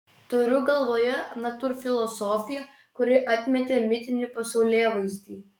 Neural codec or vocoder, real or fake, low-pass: codec, 44.1 kHz, 7.8 kbps, DAC; fake; 19.8 kHz